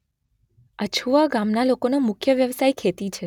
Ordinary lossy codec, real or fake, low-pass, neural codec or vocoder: none; real; 19.8 kHz; none